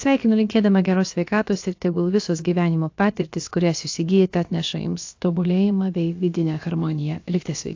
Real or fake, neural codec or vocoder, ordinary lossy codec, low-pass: fake; codec, 16 kHz, about 1 kbps, DyCAST, with the encoder's durations; AAC, 48 kbps; 7.2 kHz